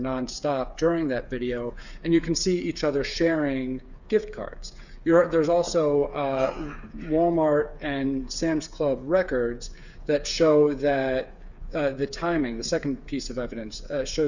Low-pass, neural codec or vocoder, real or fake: 7.2 kHz; codec, 16 kHz, 8 kbps, FreqCodec, smaller model; fake